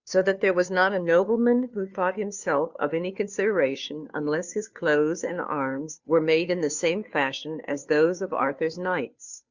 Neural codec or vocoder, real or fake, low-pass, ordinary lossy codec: codec, 16 kHz, 2 kbps, FunCodec, trained on Chinese and English, 25 frames a second; fake; 7.2 kHz; Opus, 64 kbps